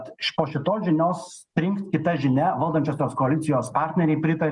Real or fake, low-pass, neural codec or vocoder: real; 10.8 kHz; none